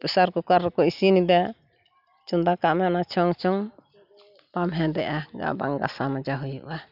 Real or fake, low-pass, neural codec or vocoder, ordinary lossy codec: real; 5.4 kHz; none; none